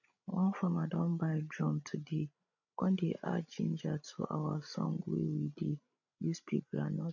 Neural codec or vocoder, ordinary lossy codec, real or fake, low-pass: codec, 16 kHz, 16 kbps, FreqCodec, larger model; none; fake; 7.2 kHz